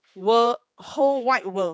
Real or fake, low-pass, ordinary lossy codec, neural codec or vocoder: fake; none; none; codec, 16 kHz, 4 kbps, X-Codec, HuBERT features, trained on general audio